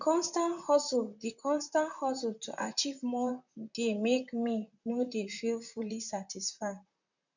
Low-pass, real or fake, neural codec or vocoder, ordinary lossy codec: 7.2 kHz; fake; vocoder, 44.1 kHz, 128 mel bands, Pupu-Vocoder; none